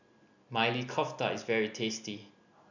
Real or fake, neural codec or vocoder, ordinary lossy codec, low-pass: real; none; none; 7.2 kHz